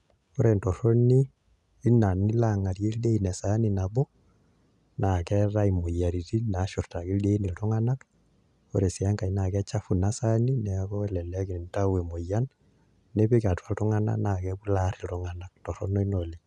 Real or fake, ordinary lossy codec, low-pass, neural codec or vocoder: real; none; none; none